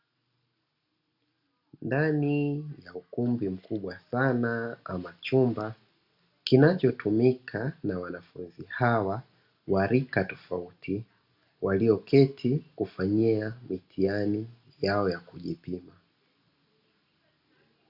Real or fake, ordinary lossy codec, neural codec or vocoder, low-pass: real; Opus, 64 kbps; none; 5.4 kHz